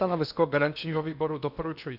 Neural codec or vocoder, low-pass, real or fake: codec, 16 kHz in and 24 kHz out, 0.8 kbps, FocalCodec, streaming, 65536 codes; 5.4 kHz; fake